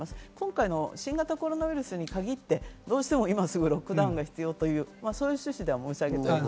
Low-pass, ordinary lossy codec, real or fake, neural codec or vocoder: none; none; real; none